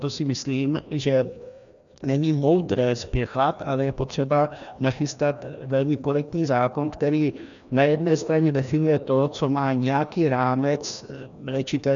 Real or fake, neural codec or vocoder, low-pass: fake; codec, 16 kHz, 1 kbps, FreqCodec, larger model; 7.2 kHz